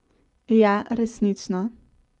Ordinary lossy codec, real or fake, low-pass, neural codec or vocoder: none; fake; 10.8 kHz; vocoder, 24 kHz, 100 mel bands, Vocos